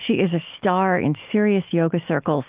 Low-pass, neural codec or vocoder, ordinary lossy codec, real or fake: 3.6 kHz; none; Opus, 32 kbps; real